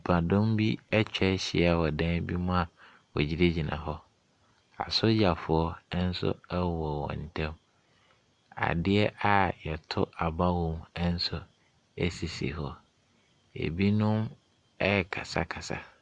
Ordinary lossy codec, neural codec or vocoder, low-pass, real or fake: Opus, 64 kbps; none; 10.8 kHz; real